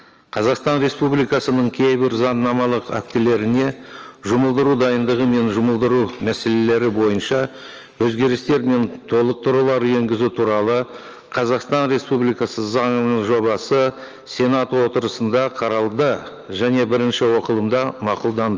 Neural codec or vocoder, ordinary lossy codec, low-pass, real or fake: none; Opus, 24 kbps; 7.2 kHz; real